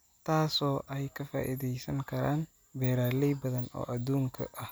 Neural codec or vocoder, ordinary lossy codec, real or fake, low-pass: none; none; real; none